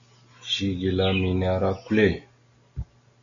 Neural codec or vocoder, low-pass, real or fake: none; 7.2 kHz; real